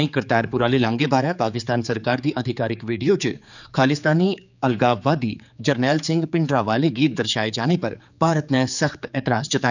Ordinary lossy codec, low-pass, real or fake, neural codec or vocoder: none; 7.2 kHz; fake; codec, 16 kHz, 4 kbps, X-Codec, HuBERT features, trained on general audio